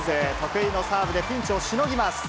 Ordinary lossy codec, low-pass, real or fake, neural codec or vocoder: none; none; real; none